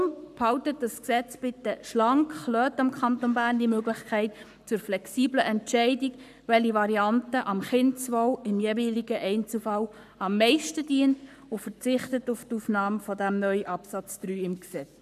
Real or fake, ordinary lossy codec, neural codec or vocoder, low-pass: fake; none; codec, 44.1 kHz, 7.8 kbps, Pupu-Codec; 14.4 kHz